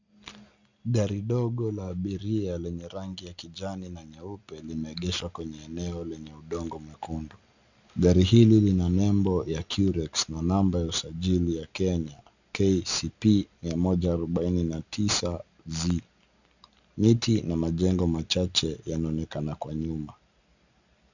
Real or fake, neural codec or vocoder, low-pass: real; none; 7.2 kHz